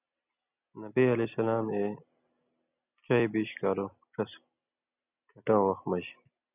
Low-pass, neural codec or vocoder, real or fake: 3.6 kHz; none; real